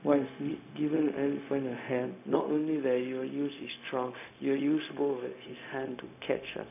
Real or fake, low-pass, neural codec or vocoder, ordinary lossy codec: fake; 3.6 kHz; codec, 16 kHz, 0.4 kbps, LongCat-Audio-Codec; none